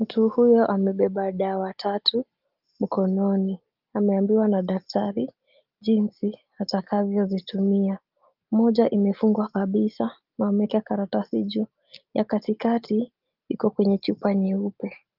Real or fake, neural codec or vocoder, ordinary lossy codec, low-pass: real; none; Opus, 24 kbps; 5.4 kHz